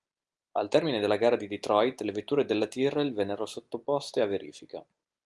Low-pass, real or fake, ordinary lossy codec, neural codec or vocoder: 10.8 kHz; real; Opus, 32 kbps; none